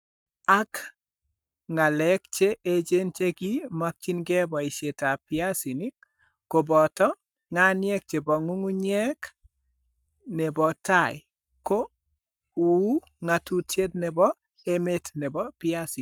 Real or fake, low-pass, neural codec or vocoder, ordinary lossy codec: fake; none; codec, 44.1 kHz, 7.8 kbps, Pupu-Codec; none